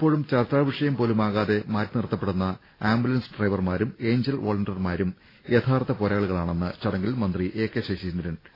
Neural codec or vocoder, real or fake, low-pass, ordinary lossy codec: none; real; 5.4 kHz; AAC, 24 kbps